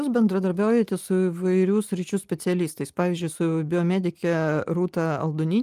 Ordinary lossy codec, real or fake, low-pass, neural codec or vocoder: Opus, 32 kbps; real; 14.4 kHz; none